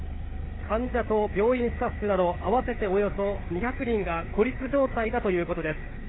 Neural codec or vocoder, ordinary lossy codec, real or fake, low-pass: codec, 16 kHz, 4 kbps, FreqCodec, larger model; AAC, 16 kbps; fake; 7.2 kHz